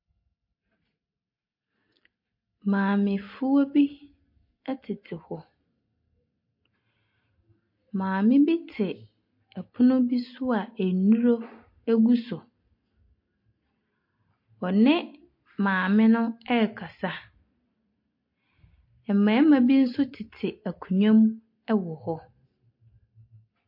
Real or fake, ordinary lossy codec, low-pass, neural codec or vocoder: real; MP3, 32 kbps; 5.4 kHz; none